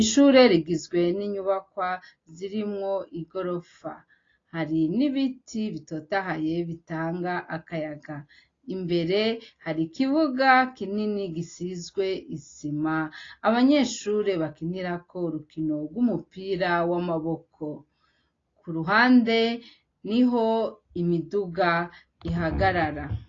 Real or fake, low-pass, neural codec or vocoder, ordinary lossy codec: real; 7.2 kHz; none; AAC, 32 kbps